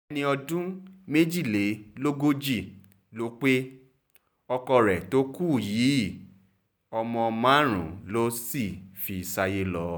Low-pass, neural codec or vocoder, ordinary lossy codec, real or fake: none; none; none; real